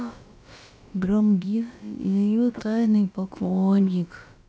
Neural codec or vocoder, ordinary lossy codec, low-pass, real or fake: codec, 16 kHz, about 1 kbps, DyCAST, with the encoder's durations; none; none; fake